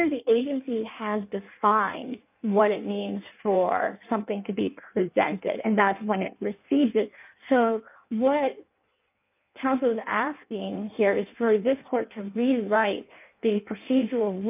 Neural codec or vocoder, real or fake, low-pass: codec, 16 kHz in and 24 kHz out, 1.1 kbps, FireRedTTS-2 codec; fake; 3.6 kHz